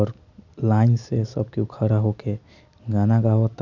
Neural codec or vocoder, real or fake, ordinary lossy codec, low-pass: none; real; none; 7.2 kHz